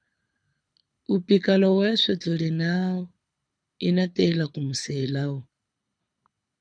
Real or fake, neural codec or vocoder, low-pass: fake; codec, 24 kHz, 6 kbps, HILCodec; 9.9 kHz